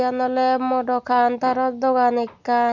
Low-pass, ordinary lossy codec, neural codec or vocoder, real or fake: 7.2 kHz; none; none; real